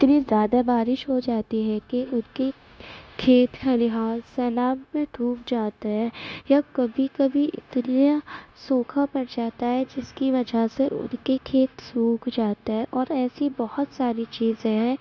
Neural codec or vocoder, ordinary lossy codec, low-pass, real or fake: codec, 16 kHz, 0.9 kbps, LongCat-Audio-Codec; none; none; fake